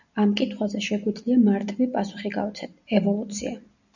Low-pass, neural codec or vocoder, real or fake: 7.2 kHz; none; real